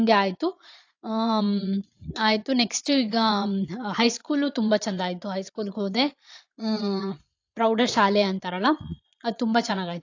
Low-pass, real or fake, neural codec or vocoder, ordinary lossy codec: 7.2 kHz; fake; vocoder, 22.05 kHz, 80 mel bands, Vocos; AAC, 48 kbps